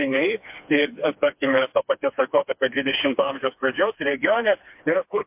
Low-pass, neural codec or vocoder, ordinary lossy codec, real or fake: 3.6 kHz; codec, 16 kHz, 2 kbps, FreqCodec, smaller model; MP3, 32 kbps; fake